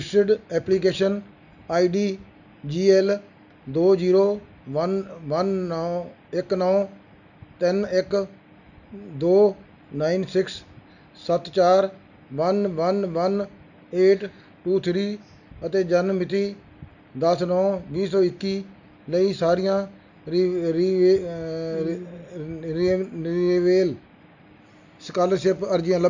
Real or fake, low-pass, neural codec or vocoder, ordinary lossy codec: real; 7.2 kHz; none; none